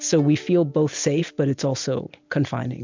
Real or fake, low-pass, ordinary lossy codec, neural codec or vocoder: real; 7.2 kHz; MP3, 64 kbps; none